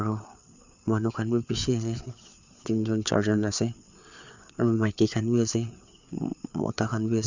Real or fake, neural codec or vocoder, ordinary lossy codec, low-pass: fake; codec, 16 kHz, 4 kbps, FreqCodec, larger model; Opus, 64 kbps; 7.2 kHz